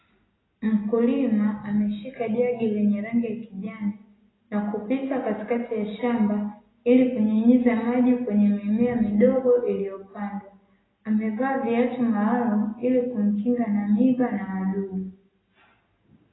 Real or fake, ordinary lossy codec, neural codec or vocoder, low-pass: real; AAC, 16 kbps; none; 7.2 kHz